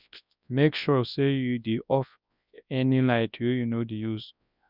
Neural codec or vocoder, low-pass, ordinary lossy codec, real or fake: codec, 24 kHz, 0.9 kbps, WavTokenizer, large speech release; 5.4 kHz; none; fake